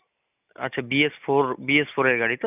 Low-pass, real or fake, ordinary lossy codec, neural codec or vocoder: 3.6 kHz; real; none; none